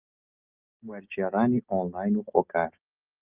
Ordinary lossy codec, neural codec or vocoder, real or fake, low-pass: Opus, 24 kbps; none; real; 3.6 kHz